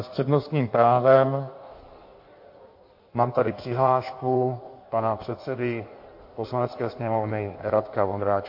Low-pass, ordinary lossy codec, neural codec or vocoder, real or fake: 5.4 kHz; MP3, 32 kbps; codec, 16 kHz in and 24 kHz out, 1.1 kbps, FireRedTTS-2 codec; fake